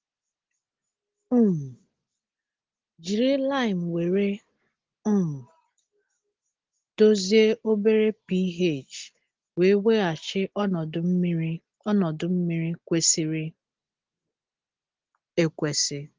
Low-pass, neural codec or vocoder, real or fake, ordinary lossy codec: 7.2 kHz; none; real; Opus, 16 kbps